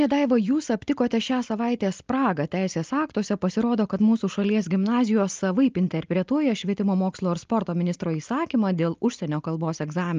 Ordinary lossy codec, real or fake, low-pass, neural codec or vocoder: Opus, 24 kbps; real; 7.2 kHz; none